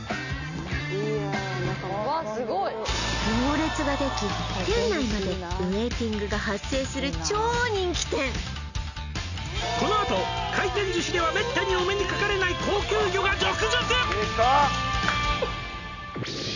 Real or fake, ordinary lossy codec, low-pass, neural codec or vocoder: real; none; 7.2 kHz; none